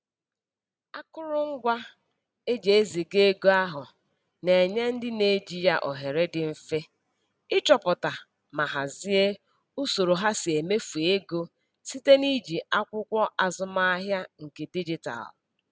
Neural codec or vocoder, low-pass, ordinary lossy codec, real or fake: none; none; none; real